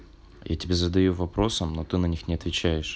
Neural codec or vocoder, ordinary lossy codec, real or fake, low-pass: none; none; real; none